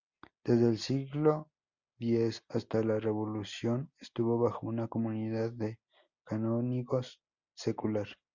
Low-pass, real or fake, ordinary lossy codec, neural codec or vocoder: 7.2 kHz; real; Opus, 64 kbps; none